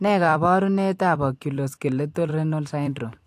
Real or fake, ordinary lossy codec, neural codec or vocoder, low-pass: fake; AAC, 64 kbps; vocoder, 44.1 kHz, 128 mel bands every 256 samples, BigVGAN v2; 14.4 kHz